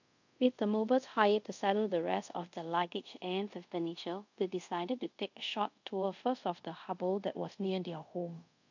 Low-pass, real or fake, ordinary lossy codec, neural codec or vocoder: 7.2 kHz; fake; none; codec, 24 kHz, 0.5 kbps, DualCodec